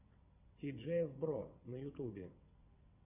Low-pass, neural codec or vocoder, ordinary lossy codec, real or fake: 3.6 kHz; vocoder, 22.05 kHz, 80 mel bands, WaveNeXt; MP3, 32 kbps; fake